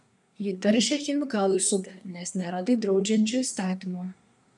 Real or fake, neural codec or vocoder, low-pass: fake; codec, 24 kHz, 1 kbps, SNAC; 10.8 kHz